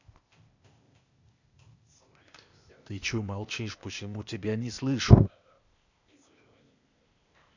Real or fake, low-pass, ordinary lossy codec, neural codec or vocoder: fake; 7.2 kHz; none; codec, 16 kHz, 0.8 kbps, ZipCodec